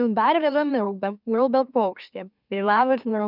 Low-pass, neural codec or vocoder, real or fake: 5.4 kHz; autoencoder, 44.1 kHz, a latent of 192 numbers a frame, MeloTTS; fake